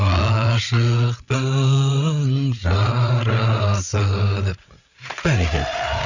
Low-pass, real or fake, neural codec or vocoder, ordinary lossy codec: 7.2 kHz; fake; codec, 16 kHz, 8 kbps, FreqCodec, larger model; none